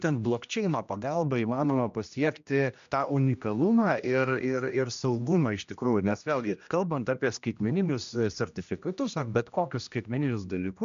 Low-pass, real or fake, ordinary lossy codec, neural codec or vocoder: 7.2 kHz; fake; MP3, 64 kbps; codec, 16 kHz, 1 kbps, X-Codec, HuBERT features, trained on general audio